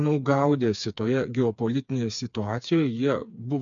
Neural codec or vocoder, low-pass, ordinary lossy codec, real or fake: codec, 16 kHz, 4 kbps, FreqCodec, smaller model; 7.2 kHz; MP3, 64 kbps; fake